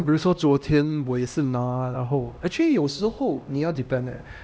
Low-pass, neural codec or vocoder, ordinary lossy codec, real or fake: none; codec, 16 kHz, 1 kbps, X-Codec, HuBERT features, trained on LibriSpeech; none; fake